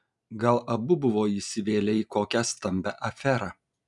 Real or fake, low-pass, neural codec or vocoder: real; 10.8 kHz; none